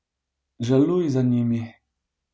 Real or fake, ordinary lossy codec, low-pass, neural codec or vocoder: real; none; none; none